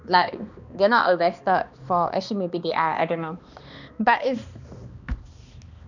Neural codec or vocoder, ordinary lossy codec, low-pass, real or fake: codec, 16 kHz, 2 kbps, X-Codec, HuBERT features, trained on balanced general audio; none; 7.2 kHz; fake